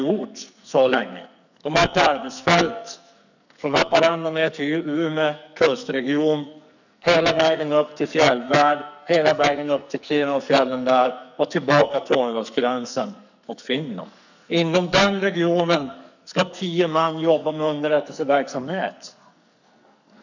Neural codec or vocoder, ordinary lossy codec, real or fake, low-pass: codec, 44.1 kHz, 2.6 kbps, SNAC; none; fake; 7.2 kHz